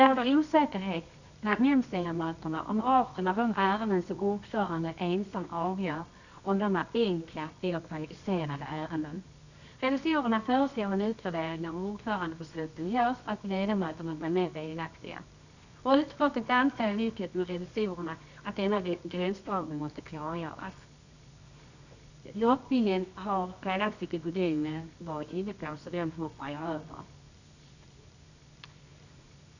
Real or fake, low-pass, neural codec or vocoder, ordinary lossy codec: fake; 7.2 kHz; codec, 24 kHz, 0.9 kbps, WavTokenizer, medium music audio release; none